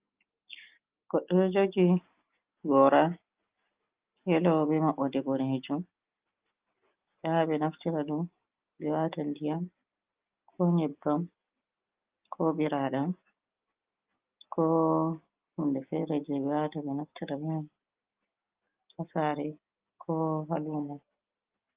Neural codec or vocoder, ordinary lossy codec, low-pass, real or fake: none; Opus, 32 kbps; 3.6 kHz; real